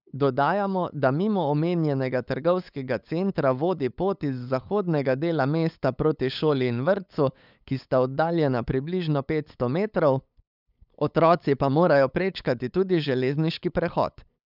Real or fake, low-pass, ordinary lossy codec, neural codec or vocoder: fake; 5.4 kHz; none; codec, 16 kHz, 8 kbps, FunCodec, trained on LibriTTS, 25 frames a second